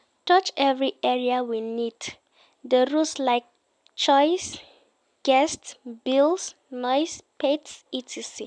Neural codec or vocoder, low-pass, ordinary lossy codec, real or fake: none; 9.9 kHz; none; real